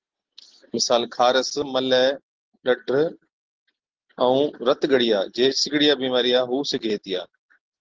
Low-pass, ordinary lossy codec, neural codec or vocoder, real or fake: 7.2 kHz; Opus, 16 kbps; none; real